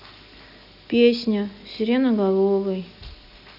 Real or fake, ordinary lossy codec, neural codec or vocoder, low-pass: real; none; none; 5.4 kHz